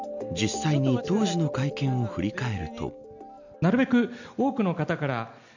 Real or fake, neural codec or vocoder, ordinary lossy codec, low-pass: real; none; none; 7.2 kHz